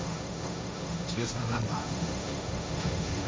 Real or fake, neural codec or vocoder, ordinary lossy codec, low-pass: fake; codec, 16 kHz, 1.1 kbps, Voila-Tokenizer; none; none